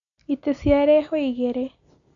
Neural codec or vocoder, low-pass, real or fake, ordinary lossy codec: none; 7.2 kHz; real; none